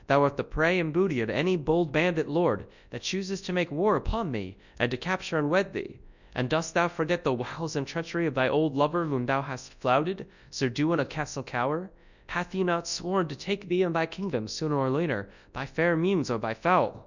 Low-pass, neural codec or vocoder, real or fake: 7.2 kHz; codec, 24 kHz, 0.9 kbps, WavTokenizer, large speech release; fake